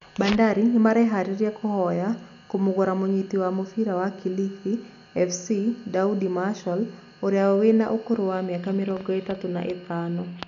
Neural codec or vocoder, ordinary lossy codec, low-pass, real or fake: none; none; 7.2 kHz; real